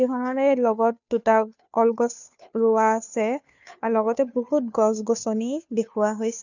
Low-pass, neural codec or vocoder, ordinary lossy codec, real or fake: 7.2 kHz; codec, 16 kHz, 2 kbps, FunCodec, trained on Chinese and English, 25 frames a second; none; fake